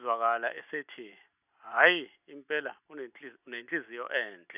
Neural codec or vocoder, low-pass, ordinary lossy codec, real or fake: none; 3.6 kHz; none; real